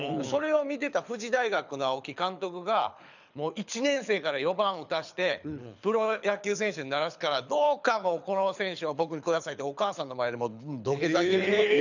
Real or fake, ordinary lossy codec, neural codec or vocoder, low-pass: fake; none; codec, 24 kHz, 6 kbps, HILCodec; 7.2 kHz